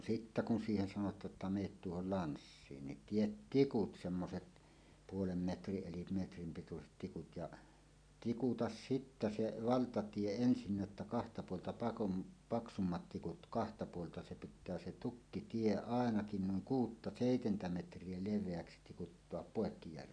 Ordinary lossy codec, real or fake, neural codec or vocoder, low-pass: none; real; none; 9.9 kHz